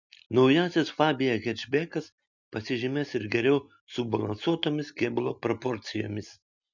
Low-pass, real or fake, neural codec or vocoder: 7.2 kHz; real; none